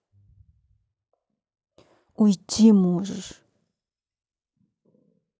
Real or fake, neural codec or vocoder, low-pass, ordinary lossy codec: real; none; none; none